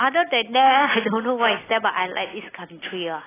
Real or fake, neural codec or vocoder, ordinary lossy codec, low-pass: real; none; AAC, 16 kbps; 3.6 kHz